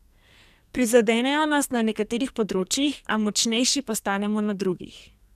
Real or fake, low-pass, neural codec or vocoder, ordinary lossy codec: fake; 14.4 kHz; codec, 44.1 kHz, 2.6 kbps, SNAC; none